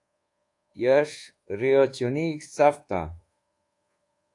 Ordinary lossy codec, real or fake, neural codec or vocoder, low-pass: AAC, 48 kbps; fake; codec, 24 kHz, 1.2 kbps, DualCodec; 10.8 kHz